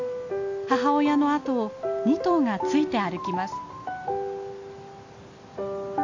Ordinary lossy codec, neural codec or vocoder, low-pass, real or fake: AAC, 48 kbps; none; 7.2 kHz; real